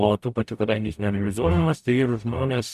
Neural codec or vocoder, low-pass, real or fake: codec, 44.1 kHz, 0.9 kbps, DAC; 14.4 kHz; fake